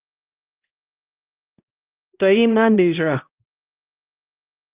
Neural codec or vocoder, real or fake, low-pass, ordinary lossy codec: codec, 16 kHz, 0.5 kbps, X-Codec, HuBERT features, trained on balanced general audio; fake; 3.6 kHz; Opus, 24 kbps